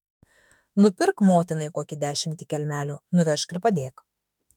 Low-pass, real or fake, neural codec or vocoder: 19.8 kHz; fake; autoencoder, 48 kHz, 32 numbers a frame, DAC-VAE, trained on Japanese speech